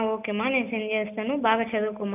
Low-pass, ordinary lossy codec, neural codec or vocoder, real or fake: 3.6 kHz; none; none; real